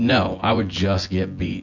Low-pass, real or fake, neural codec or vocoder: 7.2 kHz; fake; vocoder, 24 kHz, 100 mel bands, Vocos